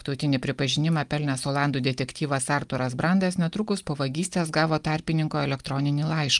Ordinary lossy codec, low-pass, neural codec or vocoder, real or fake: Opus, 32 kbps; 10.8 kHz; none; real